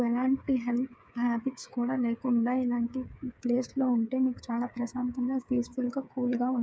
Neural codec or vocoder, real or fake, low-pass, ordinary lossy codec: codec, 16 kHz, 8 kbps, FreqCodec, smaller model; fake; none; none